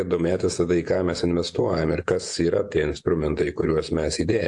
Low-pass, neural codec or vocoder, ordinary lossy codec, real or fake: 10.8 kHz; vocoder, 48 kHz, 128 mel bands, Vocos; AAC, 64 kbps; fake